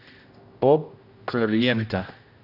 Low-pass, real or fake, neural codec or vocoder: 5.4 kHz; fake; codec, 16 kHz, 0.5 kbps, X-Codec, HuBERT features, trained on general audio